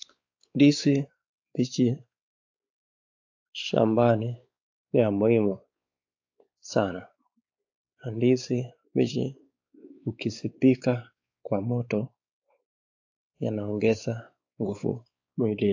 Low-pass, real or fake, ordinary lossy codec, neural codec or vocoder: 7.2 kHz; fake; AAC, 48 kbps; codec, 16 kHz, 4 kbps, X-Codec, WavLM features, trained on Multilingual LibriSpeech